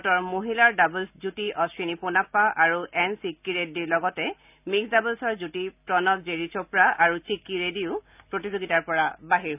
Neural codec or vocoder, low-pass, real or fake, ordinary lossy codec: none; 3.6 kHz; real; none